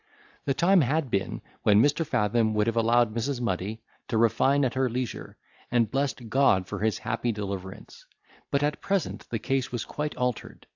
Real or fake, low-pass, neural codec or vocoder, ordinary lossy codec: real; 7.2 kHz; none; AAC, 48 kbps